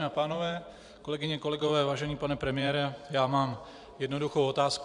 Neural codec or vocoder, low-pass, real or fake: vocoder, 44.1 kHz, 128 mel bands every 512 samples, BigVGAN v2; 10.8 kHz; fake